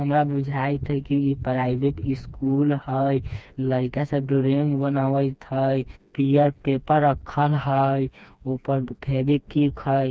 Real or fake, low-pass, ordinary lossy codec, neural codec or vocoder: fake; none; none; codec, 16 kHz, 2 kbps, FreqCodec, smaller model